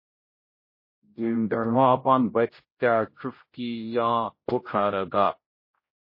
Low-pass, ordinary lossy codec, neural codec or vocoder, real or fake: 5.4 kHz; MP3, 24 kbps; codec, 16 kHz, 0.5 kbps, X-Codec, HuBERT features, trained on general audio; fake